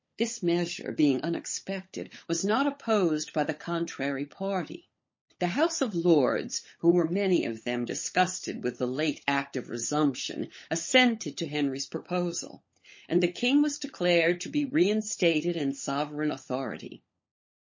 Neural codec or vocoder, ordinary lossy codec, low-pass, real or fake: codec, 16 kHz, 16 kbps, FunCodec, trained on LibriTTS, 50 frames a second; MP3, 32 kbps; 7.2 kHz; fake